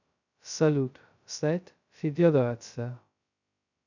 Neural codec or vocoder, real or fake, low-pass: codec, 16 kHz, 0.2 kbps, FocalCodec; fake; 7.2 kHz